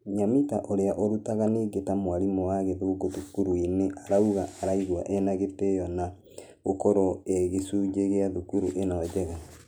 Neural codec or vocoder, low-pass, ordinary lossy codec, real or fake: none; none; none; real